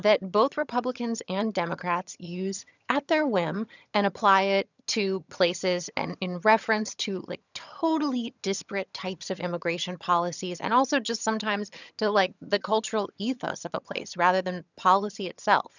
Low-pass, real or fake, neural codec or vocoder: 7.2 kHz; fake; vocoder, 22.05 kHz, 80 mel bands, HiFi-GAN